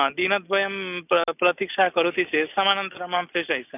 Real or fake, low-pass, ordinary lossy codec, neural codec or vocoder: real; 3.6 kHz; none; none